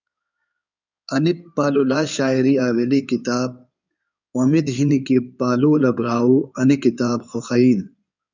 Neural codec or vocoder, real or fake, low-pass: codec, 16 kHz in and 24 kHz out, 2.2 kbps, FireRedTTS-2 codec; fake; 7.2 kHz